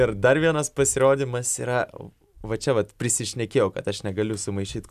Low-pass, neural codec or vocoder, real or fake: 14.4 kHz; vocoder, 44.1 kHz, 128 mel bands every 256 samples, BigVGAN v2; fake